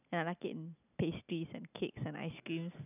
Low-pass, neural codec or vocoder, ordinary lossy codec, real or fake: 3.6 kHz; none; none; real